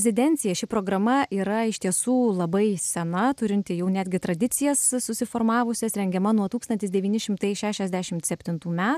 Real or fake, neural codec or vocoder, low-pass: real; none; 14.4 kHz